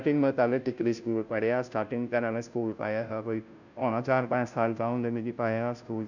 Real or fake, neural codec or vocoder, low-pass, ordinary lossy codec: fake; codec, 16 kHz, 0.5 kbps, FunCodec, trained on Chinese and English, 25 frames a second; 7.2 kHz; none